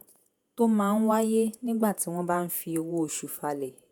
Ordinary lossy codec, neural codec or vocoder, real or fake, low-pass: none; vocoder, 48 kHz, 128 mel bands, Vocos; fake; none